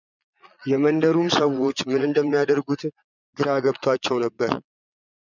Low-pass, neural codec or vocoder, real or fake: 7.2 kHz; vocoder, 22.05 kHz, 80 mel bands, Vocos; fake